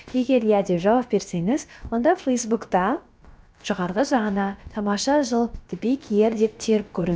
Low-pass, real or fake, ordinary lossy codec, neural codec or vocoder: none; fake; none; codec, 16 kHz, about 1 kbps, DyCAST, with the encoder's durations